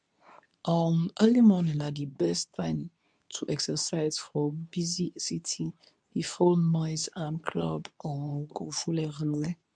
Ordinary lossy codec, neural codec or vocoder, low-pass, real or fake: none; codec, 24 kHz, 0.9 kbps, WavTokenizer, medium speech release version 2; 9.9 kHz; fake